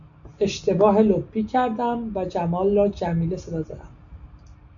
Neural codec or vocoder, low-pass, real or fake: none; 7.2 kHz; real